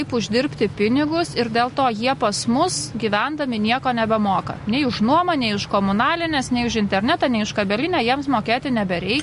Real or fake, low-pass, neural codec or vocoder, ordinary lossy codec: real; 10.8 kHz; none; MP3, 48 kbps